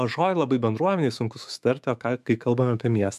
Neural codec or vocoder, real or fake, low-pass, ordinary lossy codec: autoencoder, 48 kHz, 128 numbers a frame, DAC-VAE, trained on Japanese speech; fake; 14.4 kHz; MP3, 96 kbps